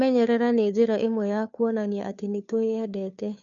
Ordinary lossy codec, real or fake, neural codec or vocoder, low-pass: none; fake; codec, 16 kHz, 2 kbps, FunCodec, trained on Chinese and English, 25 frames a second; 7.2 kHz